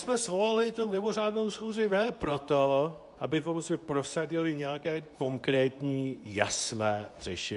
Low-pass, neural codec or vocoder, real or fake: 10.8 kHz; codec, 24 kHz, 0.9 kbps, WavTokenizer, medium speech release version 2; fake